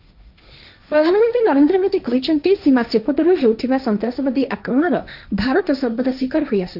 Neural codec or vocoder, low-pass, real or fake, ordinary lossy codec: codec, 16 kHz, 1.1 kbps, Voila-Tokenizer; 5.4 kHz; fake; none